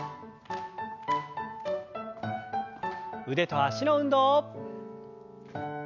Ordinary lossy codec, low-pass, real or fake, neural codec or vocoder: none; 7.2 kHz; real; none